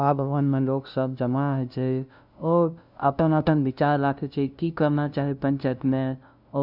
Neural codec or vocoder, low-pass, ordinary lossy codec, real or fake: codec, 16 kHz, 0.5 kbps, FunCodec, trained on LibriTTS, 25 frames a second; 5.4 kHz; none; fake